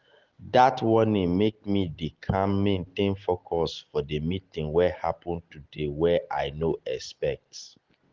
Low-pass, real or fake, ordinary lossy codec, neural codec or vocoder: 7.2 kHz; real; Opus, 16 kbps; none